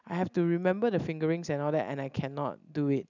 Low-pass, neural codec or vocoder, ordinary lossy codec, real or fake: 7.2 kHz; none; none; real